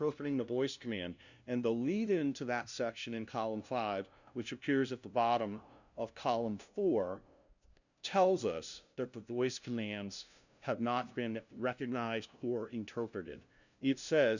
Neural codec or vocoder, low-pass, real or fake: codec, 16 kHz, 0.5 kbps, FunCodec, trained on LibriTTS, 25 frames a second; 7.2 kHz; fake